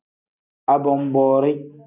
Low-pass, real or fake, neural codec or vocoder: 3.6 kHz; real; none